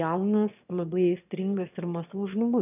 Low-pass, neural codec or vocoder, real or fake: 3.6 kHz; autoencoder, 22.05 kHz, a latent of 192 numbers a frame, VITS, trained on one speaker; fake